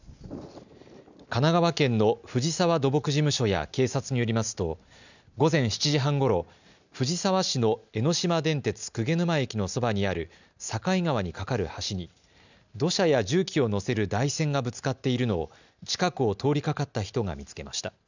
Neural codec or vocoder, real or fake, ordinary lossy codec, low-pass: none; real; none; 7.2 kHz